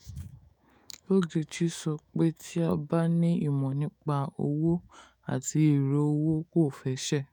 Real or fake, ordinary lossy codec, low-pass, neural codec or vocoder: fake; none; none; autoencoder, 48 kHz, 128 numbers a frame, DAC-VAE, trained on Japanese speech